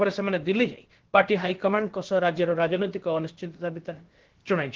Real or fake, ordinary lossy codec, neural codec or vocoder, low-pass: fake; Opus, 16 kbps; codec, 16 kHz, about 1 kbps, DyCAST, with the encoder's durations; 7.2 kHz